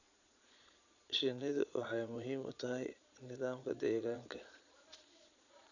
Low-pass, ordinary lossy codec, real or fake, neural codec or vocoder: 7.2 kHz; none; fake; vocoder, 22.05 kHz, 80 mel bands, Vocos